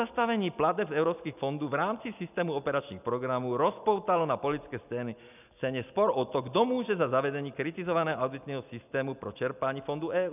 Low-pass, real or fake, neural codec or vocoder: 3.6 kHz; real; none